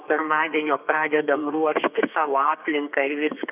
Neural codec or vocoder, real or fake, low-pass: codec, 32 kHz, 1.9 kbps, SNAC; fake; 3.6 kHz